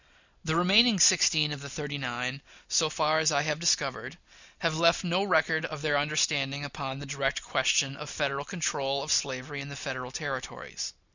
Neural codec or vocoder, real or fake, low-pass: none; real; 7.2 kHz